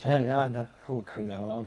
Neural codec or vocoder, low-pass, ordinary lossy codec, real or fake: codec, 24 kHz, 1.5 kbps, HILCodec; none; none; fake